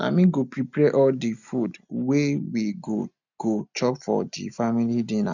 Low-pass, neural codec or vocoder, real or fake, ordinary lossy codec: 7.2 kHz; none; real; none